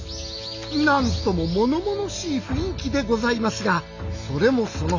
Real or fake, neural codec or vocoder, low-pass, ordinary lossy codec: real; none; 7.2 kHz; none